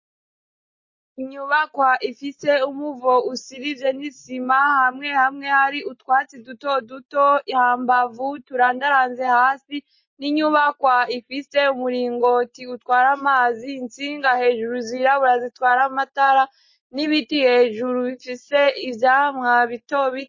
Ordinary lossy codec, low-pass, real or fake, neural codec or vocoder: MP3, 32 kbps; 7.2 kHz; real; none